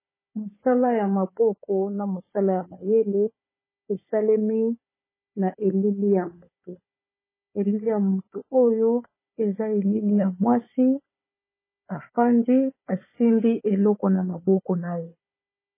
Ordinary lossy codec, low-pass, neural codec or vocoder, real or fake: MP3, 16 kbps; 3.6 kHz; codec, 16 kHz, 4 kbps, FunCodec, trained on Chinese and English, 50 frames a second; fake